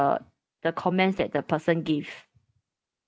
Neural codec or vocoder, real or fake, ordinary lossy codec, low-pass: none; real; none; none